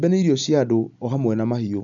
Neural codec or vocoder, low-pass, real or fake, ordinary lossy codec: none; 7.2 kHz; real; none